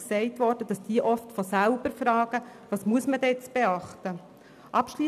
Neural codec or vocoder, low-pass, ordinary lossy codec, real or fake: none; 14.4 kHz; none; real